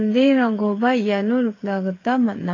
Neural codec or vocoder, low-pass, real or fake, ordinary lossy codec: codec, 16 kHz, 8 kbps, FreqCodec, smaller model; 7.2 kHz; fake; AAC, 32 kbps